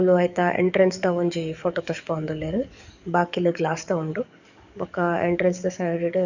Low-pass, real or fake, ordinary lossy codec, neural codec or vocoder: 7.2 kHz; fake; none; codec, 44.1 kHz, 7.8 kbps, DAC